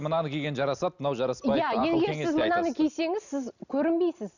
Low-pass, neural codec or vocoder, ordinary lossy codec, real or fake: 7.2 kHz; none; none; real